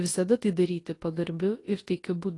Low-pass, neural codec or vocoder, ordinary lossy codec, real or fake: 10.8 kHz; codec, 24 kHz, 0.9 kbps, WavTokenizer, large speech release; AAC, 32 kbps; fake